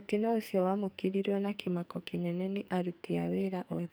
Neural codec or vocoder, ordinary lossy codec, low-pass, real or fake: codec, 44.1 kHz, 2.6 kbps, SNAC; none; none; fake